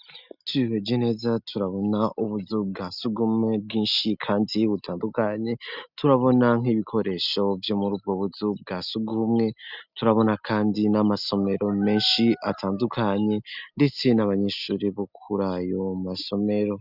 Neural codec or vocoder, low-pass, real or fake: none; 5.4 kHz; real